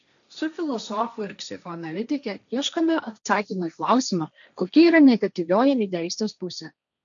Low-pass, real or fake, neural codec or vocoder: 7.2 kHz; fake; codec, 16 kHz, 1.1 kbps, Voila-Tokenizer